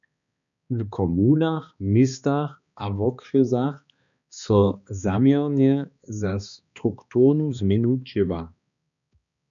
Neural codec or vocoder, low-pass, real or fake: codec, 16 kHz, 2 kbps, X-Codec, HuBERT features, trained on balanced general audio; 7.2 kHz; fake